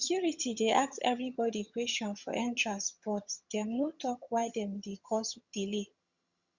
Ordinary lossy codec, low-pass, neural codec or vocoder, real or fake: Opus, 64 kbps; 7.2 kHz; vocoder, 22.05 kHz, 80 mel bands, HiFi-GAN; fake